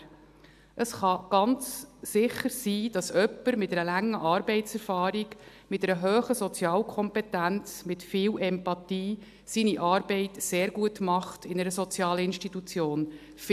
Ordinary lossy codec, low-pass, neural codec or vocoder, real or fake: AAC, 96 kbps; 14.4 kHz; none; real